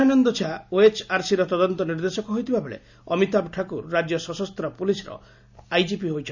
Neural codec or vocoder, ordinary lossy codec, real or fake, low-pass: none; none; real; 7.2 kHz